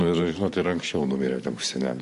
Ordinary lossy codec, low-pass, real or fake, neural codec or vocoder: MP3, 48 kbps; 14.4 kHz; fake; vocoder, 44.1 kHz, 128 mel bands every 512 samples, BigVGAN v2